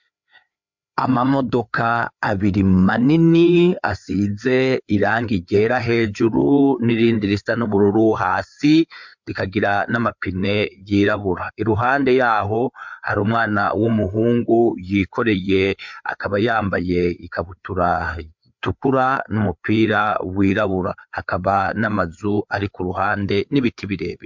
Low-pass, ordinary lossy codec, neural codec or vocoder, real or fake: 7.2 kHz; MP3, 64 kbps; codec, 16 kHz, 4 kbps, FreqCodec, larger model; fake